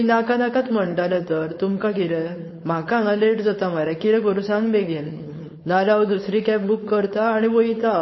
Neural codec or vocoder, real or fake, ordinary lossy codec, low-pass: codec, 16 kHz, 4.8 kbps, FACodec; fake; MP3, 24 kbps; 7.2 kHz